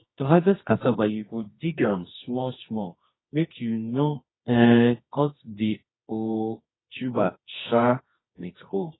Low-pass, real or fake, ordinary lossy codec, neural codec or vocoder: 7.2 kHz; fake; AAC, 16 kbps; codec, 24 kHz, 0.9 kbps, WavTokenizer, medium music audio release